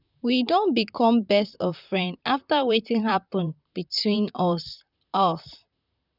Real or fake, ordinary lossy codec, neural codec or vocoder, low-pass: fake; none; vocoder, 44.1 kHz, 128 mel bands, Pupu-Vocoder; 5.4 kHz